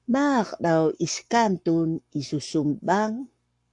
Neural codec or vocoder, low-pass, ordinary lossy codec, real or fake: codec, 44.1 kHz, 7.8 kbps, Pupu-Codec; 10.8 kHz; AAC, 64 kbps; fake